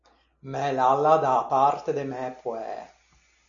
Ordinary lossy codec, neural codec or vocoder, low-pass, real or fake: MP3, 96 kbps; none; 7.2 kHz; real